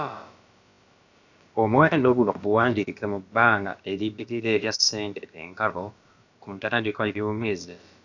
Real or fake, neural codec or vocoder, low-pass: fake; codec, 16 kHz, about 1 kbps, DyCAST, with the encoder's durations; 7.2 kHz